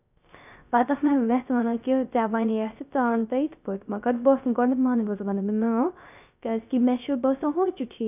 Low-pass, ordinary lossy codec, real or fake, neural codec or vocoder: 3.6 kHz; none; fake; codec, 16 kHz, 0.3 kbps, FocalCodec